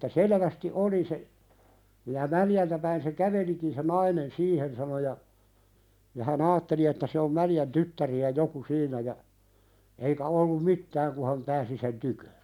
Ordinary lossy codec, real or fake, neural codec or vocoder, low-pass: none; real; none; 19.8 kHz